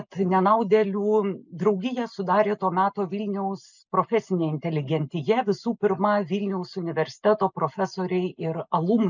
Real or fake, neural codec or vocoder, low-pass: real; none; 7.2 kHz